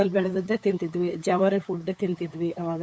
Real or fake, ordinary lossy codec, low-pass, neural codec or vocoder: fake; none; none; codec, 16 kHz, 8 kbps, FunCodec, trained on LibriTTS, 25 frames a second